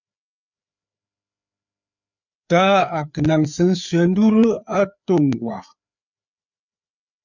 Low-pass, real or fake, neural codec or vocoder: 7.2 kHz; fake; codec, 16 kHz, 4 kbps, FreqCodec, larger model